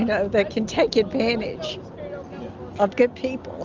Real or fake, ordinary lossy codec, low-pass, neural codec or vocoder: real; Opus, 32 kbps; 7.2 kHz; none